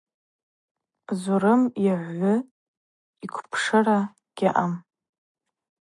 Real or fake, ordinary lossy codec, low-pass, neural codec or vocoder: real; MP3, 64 kbps; 10.8 kHz; none